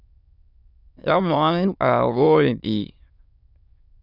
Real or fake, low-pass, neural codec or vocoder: fake; 5.4 kHz; autoencoder, 22.05 kHz, a latent of 192 numbers a frame, VITS, trained on many speakers